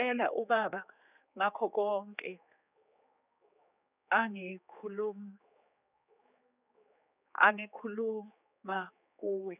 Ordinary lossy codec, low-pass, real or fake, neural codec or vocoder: none; 3.6 kHz; fake; codec, 16 kHz, 4 kbps, X-Codec, HuBERT features, trained on general audio